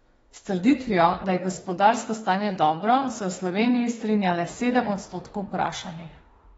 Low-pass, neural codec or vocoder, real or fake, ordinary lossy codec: 19.8 kHz; autoencoder, 48 kHz, 32 numbers a frame, DAC-VAE, trained on Japanese speech; fake; AAC, 24 kbps